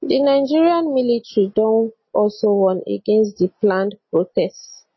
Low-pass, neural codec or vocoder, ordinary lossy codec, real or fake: 7.2 kHz; none; MP3, 24 kbps; real